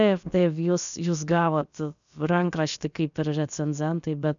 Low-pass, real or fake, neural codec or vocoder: 7.2 kHz; fake; codec, 16 kHz, about 1 kbps, DyCAST, with the encoder's durations